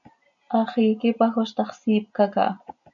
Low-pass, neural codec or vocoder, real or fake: 7.2 kHz; none; real